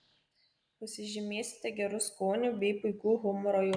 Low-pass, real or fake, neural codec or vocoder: 9.9 kHz; real; none